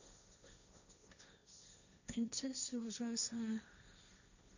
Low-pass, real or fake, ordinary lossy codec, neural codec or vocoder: 7.2 kHz; fake; none; codec, 16 kHz, 1.1 kbps, Voila-Tokenizer